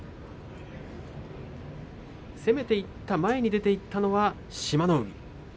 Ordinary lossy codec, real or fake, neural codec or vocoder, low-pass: none; real; none; none